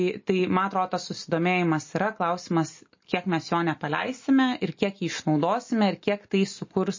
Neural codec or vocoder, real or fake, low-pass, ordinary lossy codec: none; real; 7.2 kHz; MP3, 32 kbps